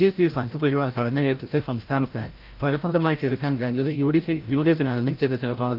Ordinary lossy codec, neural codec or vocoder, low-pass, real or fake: Opus, 32 kbps; codec, 16 kHz, 0.5 kbps, FreqCodec, larger model; 5.4 kHz; fake